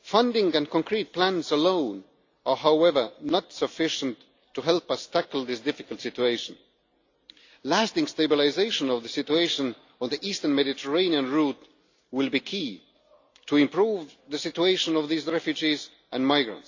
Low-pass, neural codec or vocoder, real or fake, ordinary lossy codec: 7.2 kHz; none; real; AAC, 48 kbps